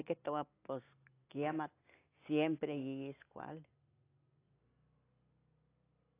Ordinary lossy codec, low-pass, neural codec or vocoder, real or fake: AAC, 24 kbps; 3.6 kHz; none; real